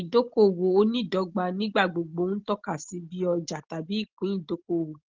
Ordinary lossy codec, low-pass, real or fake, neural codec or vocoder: Opus, 16 kbps; 7.2 kHz; real; none